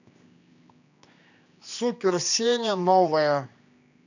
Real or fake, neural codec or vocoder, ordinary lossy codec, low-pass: fake; codec, 16 kHz, 2 kbps, X-Codec, HuBERT features, trained on general audio; none; 7.2 kHz